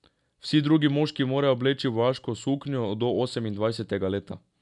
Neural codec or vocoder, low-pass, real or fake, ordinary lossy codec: none; 10.8 kHz; real; none